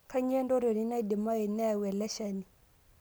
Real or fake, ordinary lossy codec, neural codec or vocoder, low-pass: real; none; none; none